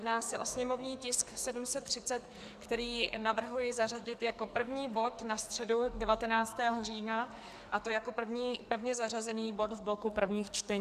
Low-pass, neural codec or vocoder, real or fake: 14.4 kHz; codec, 44.1 kHz, 2.6 kbps, SNAC; fake